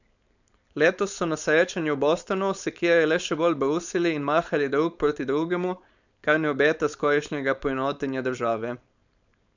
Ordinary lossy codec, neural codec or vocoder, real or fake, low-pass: none; codec, 16 kHz, 4.8 kbps, FACodec; fake; 7.2 kHz